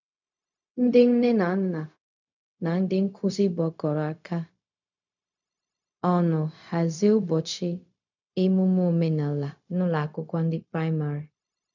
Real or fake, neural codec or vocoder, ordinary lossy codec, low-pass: fake; codec, 16 kHz, 0.4 kbps, LongCat-Audio-Codec; none; 7.2 kHz